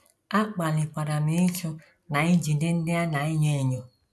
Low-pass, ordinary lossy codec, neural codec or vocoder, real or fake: none; none; none; real